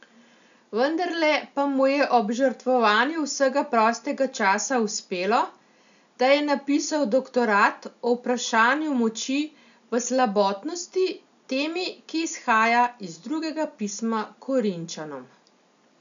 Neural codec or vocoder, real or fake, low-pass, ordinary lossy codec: none; real; 7.2 kHz; none